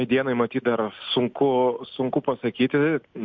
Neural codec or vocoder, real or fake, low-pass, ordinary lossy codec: none; real; 7.2 kHz; MP3, 48 kbps